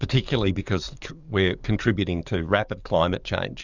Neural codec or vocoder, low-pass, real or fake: codec, 44.1 kHz, 7.8 kbps, DAC; 7.2 kHz; fake